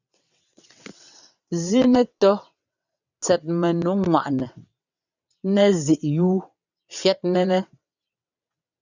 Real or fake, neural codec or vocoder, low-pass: fake; vocoder, 22.05 kHz, 80 mel bands, WaveNeXt; 7.2 kHz